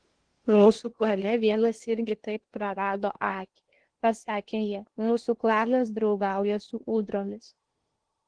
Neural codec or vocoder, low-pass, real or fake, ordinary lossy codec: codec, 16 kHz in and 24 kHz out, 0.8 kbps, FocalCodec, streaming, 65536 codes; 9.9 kHz; fake; Opus, 16 kbps